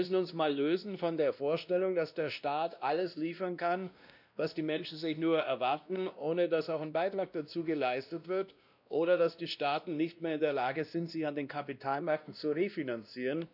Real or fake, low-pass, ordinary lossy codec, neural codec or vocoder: fake; 5.4 kHz; none; codec, 16 kHz, 1 kbps, X-Codec, WavLM features, trained on Multilingual LibriSpeech